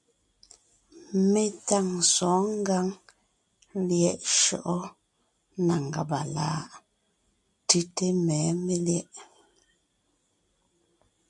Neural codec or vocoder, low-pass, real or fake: none; 10.8 kHz; real